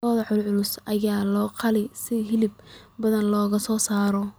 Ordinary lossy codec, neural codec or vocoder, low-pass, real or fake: none; vocoder, 44.1 kHz, 128 mel bands every 256 samples, BigVGAN v2; none; fake